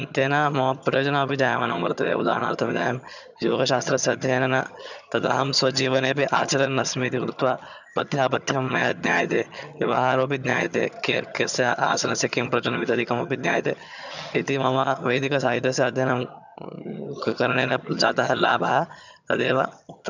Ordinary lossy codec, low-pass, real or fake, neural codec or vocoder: none; 7.2 kHz; fake; vocoder, 22.05 kHz, 80 mel bands, HiFi-GAN